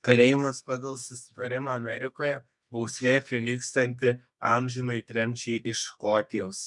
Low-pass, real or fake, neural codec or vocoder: 10.8 kHz; fake; codec, 24 kHz, 0.9 kbps, WavTokenizer, medium music audio release